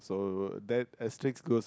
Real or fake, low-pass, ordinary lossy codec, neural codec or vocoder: real; none; none; none